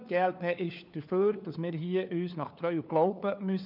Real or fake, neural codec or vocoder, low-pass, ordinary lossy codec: fake; codec, 16 kHz, 4 kbps, FunCodec, trained on LibriTTS, 50 frames a second; 5.4 kHz; none